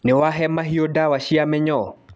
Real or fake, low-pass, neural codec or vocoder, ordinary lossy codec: real; none; none; none